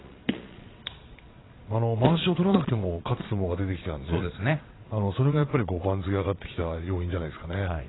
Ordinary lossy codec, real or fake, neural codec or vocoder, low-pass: AAC, 16 kbps; fake; vocoder, 22.05 kHz, 80 mel bands, Vocos; 7.2 kHz